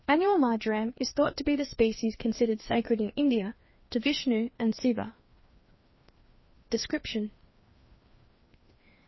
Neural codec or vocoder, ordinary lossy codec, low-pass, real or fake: codec, 16 kHz, 2 kbps, FreqCodec, larger model; MP3, 24 kbps; 7.2 kHz; fake